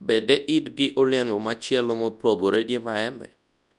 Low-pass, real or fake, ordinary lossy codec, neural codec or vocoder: 10.8 kHz; fake; none; codec, 24 kHz, 0.9 kbps, WavTokenizer, large speech release